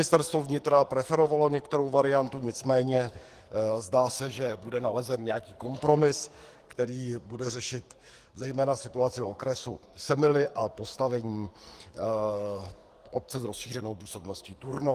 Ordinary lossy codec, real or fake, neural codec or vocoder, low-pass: Opus, 24 kbps; fake; codec, 44.1 kHz, 2.6 kbps, SNAC; 14.4 kHz